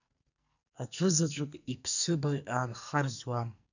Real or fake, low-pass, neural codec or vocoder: fake; 7.2 kHz; codec, 44.1 kHz, 2.6 kbps, SNAC